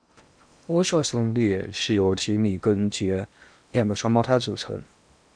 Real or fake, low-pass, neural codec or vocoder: fake; 9.9 kHz; codec, 16 kHz in and 24 kHz out, 0.8 kbps, FocalCodec, streaming, 65536 codes